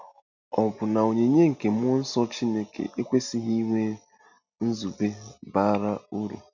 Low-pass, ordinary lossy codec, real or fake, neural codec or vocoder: 7.2 kHz; none; real; none